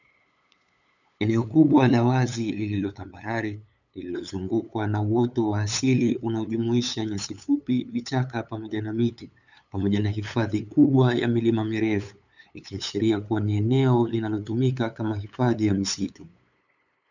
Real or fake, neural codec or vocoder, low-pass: fake; codec, 16 kHz, 8 kbps, FunCodec, trained on LibriTTS, 25 frames a second; 7.2 kHz